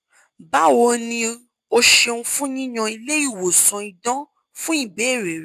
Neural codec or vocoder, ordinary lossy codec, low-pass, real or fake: none; AAC, 96 kbps; 14.4 kHz; real